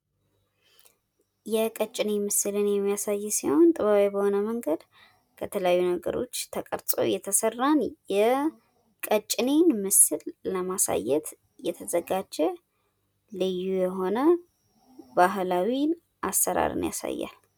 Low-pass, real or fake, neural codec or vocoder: 19.8 kHz; real; none